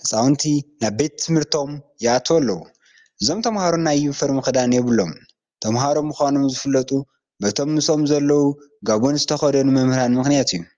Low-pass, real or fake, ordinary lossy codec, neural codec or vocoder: 7.2 kHz; real; Opus, 24 kbps; none